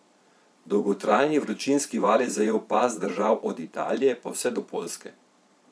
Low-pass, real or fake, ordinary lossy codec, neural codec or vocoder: none; fake; none; vocoder, 22.05 kHz, 80 mel bands, Vocos